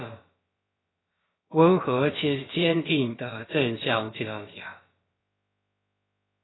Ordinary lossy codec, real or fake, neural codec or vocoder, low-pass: AAC, 16 kbps; fake; codec, 16 kHz, about 1 kbps, DyCAST, with the encoder's durations; 7.2 kHz